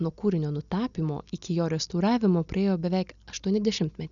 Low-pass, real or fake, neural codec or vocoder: 7.2 kHz; real; none